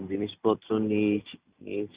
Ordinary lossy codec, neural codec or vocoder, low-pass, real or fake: Opus, 64 kbps; vocoder, 44.1 kHz, 128 mel bands every 256 samples, BigVGAN v2; 3.6 kHz; fake